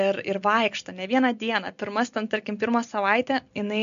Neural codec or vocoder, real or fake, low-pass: none; real; 7.2 kHz